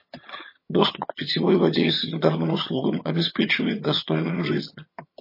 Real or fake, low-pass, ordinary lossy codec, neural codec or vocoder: fake; 5.4 kHz; MP3, 24 kbps; vocoder, 22.05 kHz, 80 mel bands, HiFi-GAN